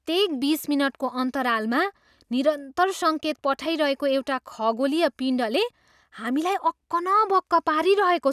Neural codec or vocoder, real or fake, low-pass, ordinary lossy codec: none; real; 14.4 kHz; none